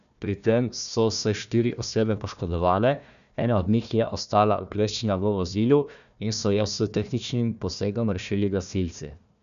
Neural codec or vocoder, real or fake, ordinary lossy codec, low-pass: codec, 16 kHz, 1 kbps, FunCodec, trained on Chinese and English, 50 frames a second; fake; none; 7.2 kHz